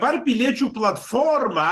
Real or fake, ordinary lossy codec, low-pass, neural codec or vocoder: fake; Opus, 16 kbps; 14.4 kHz; vocoder, 44.1 kHz, 128 mel bands every 512 samples, BigVGAN v2